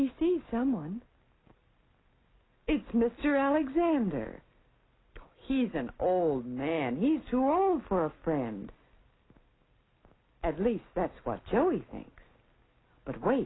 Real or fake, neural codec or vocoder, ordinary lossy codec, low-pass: real; none; AAC, 16 kbps; 7.2 kHz